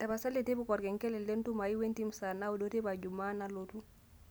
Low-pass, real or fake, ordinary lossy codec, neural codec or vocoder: none; real; none; none